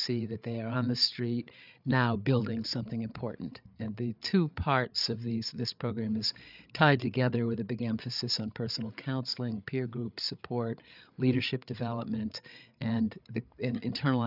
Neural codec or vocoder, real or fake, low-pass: codec, 16 kHz, 8 kbps, FreqCodec, larger model; fake; 5.4 kHz